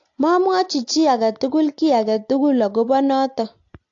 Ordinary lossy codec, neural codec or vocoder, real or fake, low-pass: AAC, 48 kbps; none; real; 7.2 kHz